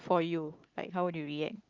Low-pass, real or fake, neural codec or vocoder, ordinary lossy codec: 7.2 kHz; real; none; Opus, 24 kbps